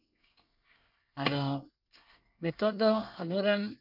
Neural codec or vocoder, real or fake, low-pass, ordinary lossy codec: codec, 24 kHz, 1 kbps, SNAC; fake; 5.4 kHz; none